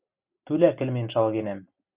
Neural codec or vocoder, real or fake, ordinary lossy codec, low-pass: none; real; Opus, 64 kbps; 3.6 kHz